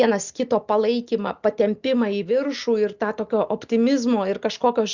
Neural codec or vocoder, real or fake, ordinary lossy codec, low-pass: none; real; Opus, 64 kbps; 7.2 kHz